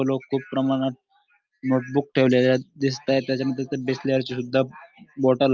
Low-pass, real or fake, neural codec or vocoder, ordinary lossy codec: 7.2 kHz; real; none; Opus, 24 kbps